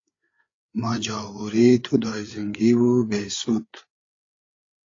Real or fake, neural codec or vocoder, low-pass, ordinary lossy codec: fake; codec, 16 kHz, 8 kbps, FreqCodec, larger model; 7.2 kHz; AAC, 32 kbps